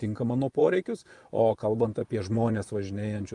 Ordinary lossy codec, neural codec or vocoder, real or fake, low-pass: Opus, 32 kbps; vocoder, 24 kHz, 100 mel bands, Vocos; fake; 10.8 kHz